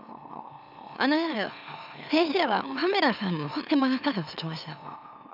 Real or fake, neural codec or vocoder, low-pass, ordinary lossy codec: fake; autoencoder, 44.1 kHz, a latent of 192 numbers a frame, MeloTTS; 5.4 kHz; none